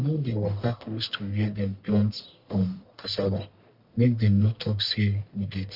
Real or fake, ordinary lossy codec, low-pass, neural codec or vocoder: fake; none; 5.4 kHz; codec, 44.1 kHz, 1.7 kbps, Pupu-Codec